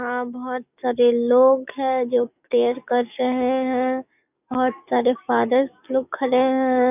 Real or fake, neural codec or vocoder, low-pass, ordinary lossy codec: fake; codec, 44.1 kHz, 7.8 kbps, DAC; 3.6 kHz; none